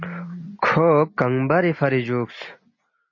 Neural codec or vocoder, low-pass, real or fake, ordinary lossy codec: none; 7.2 kHz; real; MP3, 32 kbps